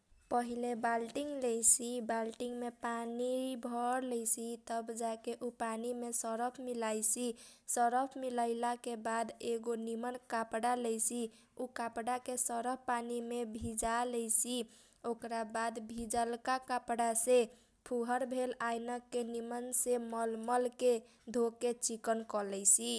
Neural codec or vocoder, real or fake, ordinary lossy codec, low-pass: none; real; none; none